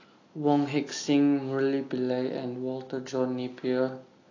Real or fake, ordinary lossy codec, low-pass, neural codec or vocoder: real; AAC, 32 kbps; 7.2 kHz; none